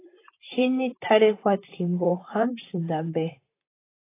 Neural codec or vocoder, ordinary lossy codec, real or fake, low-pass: vocoder, 44.1 kHz, 128 mel bands, Pupu-Vocoder; AAC, 24 kbps; fake; 3.6 kHz